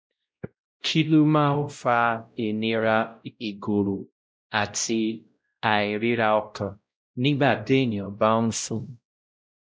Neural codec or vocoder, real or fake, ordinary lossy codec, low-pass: codec, 16 kHz, 0.5 kbps, X-Codec, WavLM features, trained on Multilingual LibriSpeech; fake; none; none